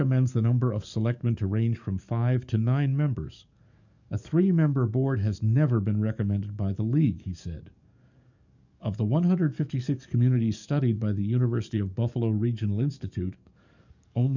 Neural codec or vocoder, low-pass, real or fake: codec, 44.1 kHz, 7.8 kbps, DAC; 7.2 kHz; fake